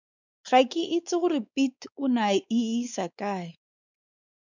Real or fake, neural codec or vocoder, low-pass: fake; codec, 16 kHz, 4 kbps, X-Codec, WavLM features, trained on Multilingual LibriSpeech; 7.2 kHz